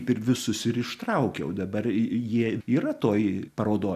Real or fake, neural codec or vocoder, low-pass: real; none; 14.4 kHz